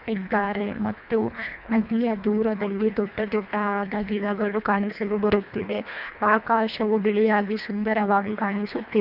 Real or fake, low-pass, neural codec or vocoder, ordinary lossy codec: fake; 5.4 kHz; codec, 24 kHz, 1.5 kbps, HILCodec; none